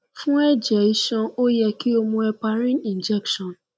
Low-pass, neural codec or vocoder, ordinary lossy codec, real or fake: none; none; none; real